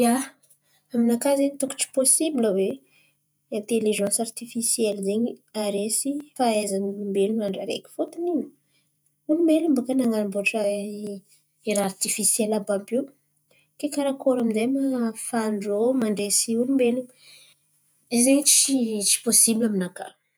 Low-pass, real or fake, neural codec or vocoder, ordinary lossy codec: none; fake; vocoder, 48 kHz, 128 mel bands, Vocos; none